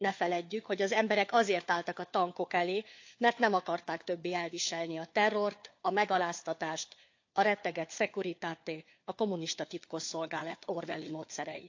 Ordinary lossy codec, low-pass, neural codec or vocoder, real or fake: AAC, 48 kbps; 7.2 kHz; codec, 16 kHz, 4 kbps, FunCodec, trained on LibriTTS, 50 frames a second; fake